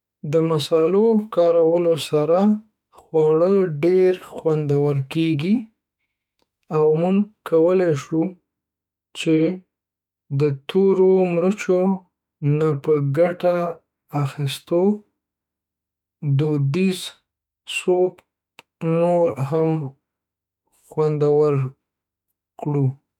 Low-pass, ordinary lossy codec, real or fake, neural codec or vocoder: 19.8 kHz; none; fake; autoencoder, 48 kHz, 32 numbers a frame, DAC-VAE, trained on Japanese speech